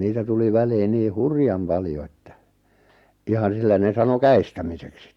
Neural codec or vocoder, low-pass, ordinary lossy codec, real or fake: none; 19.8 kHz; Opus, 64 kbps; real